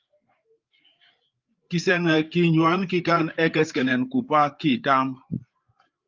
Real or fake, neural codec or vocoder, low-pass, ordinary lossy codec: fake; codec, 16 kHz, 8 kbps, FreqCodec, larger model; 7.2 kHz; Opus, 24 kbps